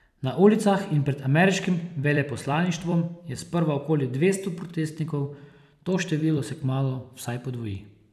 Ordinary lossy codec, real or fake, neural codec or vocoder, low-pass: none; fake; vocoder, 44.1 kHz, 128 mel bands every 256 samples, BigVGAN v2; 14.4 kHz